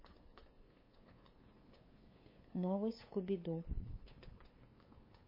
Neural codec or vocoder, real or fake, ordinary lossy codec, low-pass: codec, 16 kHz, 2 kbps, FunCodec, trained on LibriTTS, 25 frames a second; fake; MP3, 24 kbps; 5.4 kHz